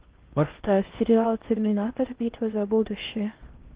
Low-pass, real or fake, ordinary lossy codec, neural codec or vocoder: 3.6 kHz; fake; Opus, 16 kbps; codec, 16 kHz in and 24 kHz out, 0.6 kbps, FocalCodec, streaming, 4096 codes